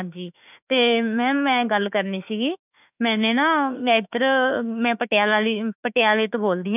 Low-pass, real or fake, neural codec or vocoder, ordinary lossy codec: 3.6 kHz; fake; autoencoder, 48 kHz, 32 numbers a frame, DAC-VAE, trained on Japanese speech; none